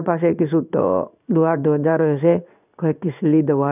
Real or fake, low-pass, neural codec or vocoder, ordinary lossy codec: fake; 3.6 kHz; codec, 16 kHz in and 24 kHz out, 1 kbps, XY-Tokenizer; none